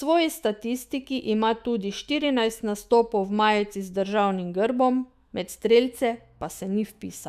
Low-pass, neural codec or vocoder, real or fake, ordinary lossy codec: 14.4 kHz; autoencoder, 48 kHz, 128 numbers a frame, DAC-VAE, trained on Japanese speech; fake; AAC, 96 kbps